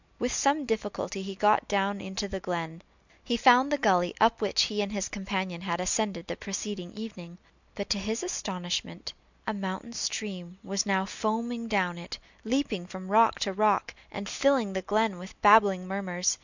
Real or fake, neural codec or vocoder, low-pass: real; none; 7.2 kHz